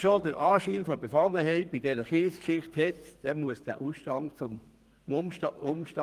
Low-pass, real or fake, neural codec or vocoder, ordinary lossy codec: 14.4 kHz; fake; codec, 32 kHz, 1.9 kbps, SNAC; Opus, 32 kbps